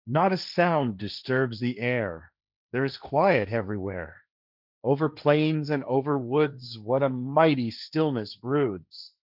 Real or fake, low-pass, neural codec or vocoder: fake; 5.4 kHz; codec, 16 kHz, 1.1 kbps, Voila-Tokenizer